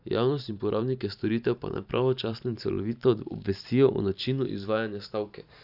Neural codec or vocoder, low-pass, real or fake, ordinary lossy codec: vocoder, 44.1 kHz, 128 mel bands every 512 samples, BigVGAN v2; 5.4 kHz; fake; none